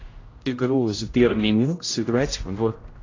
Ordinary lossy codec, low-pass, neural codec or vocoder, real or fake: AAC, 32 kbps; 7.2 kHz; codec, 16 kHz, 0.5 kbps, X-Codec, HuBERT features, trained on general audio; fake